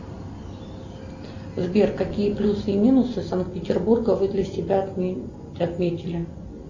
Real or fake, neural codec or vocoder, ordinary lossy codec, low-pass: real; none; Opus, 64 kbps; 7.2 kHz